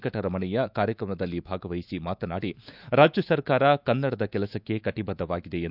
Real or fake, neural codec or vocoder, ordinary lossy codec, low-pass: fake; autoencoder, 48 kHz, 128 numbers a frame, DAC-VAE, trained on Japanese speech; none; 5.4 kHz